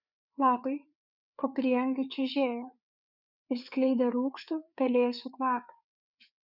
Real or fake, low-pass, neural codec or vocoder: fake; 5.4 kHz; codec, 16 kHz, 4 kbps, FreqCodec, larger model